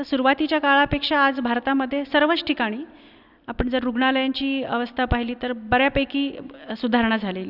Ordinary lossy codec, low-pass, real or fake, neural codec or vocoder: none; 5.4 kHz; real; none